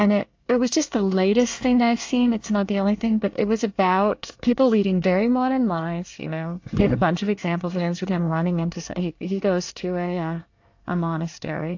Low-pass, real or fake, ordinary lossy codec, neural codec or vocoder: 7.2 kHz; fake; AAC, 48 kbps; codec, 24 kHz, 1 kbps, SNAC